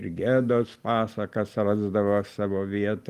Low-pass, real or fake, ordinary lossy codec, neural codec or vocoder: 14.4 kHz; real; Opus, 32 kbps; none